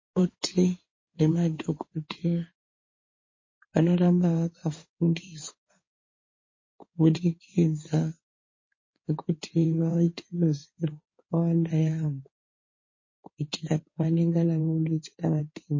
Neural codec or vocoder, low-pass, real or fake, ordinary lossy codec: codec, 16 kHz in and 24 kHz out, 2.2 kbps, FireRedTTS-2 codec; 7.2 kHz; fake; MP3, 32 kbps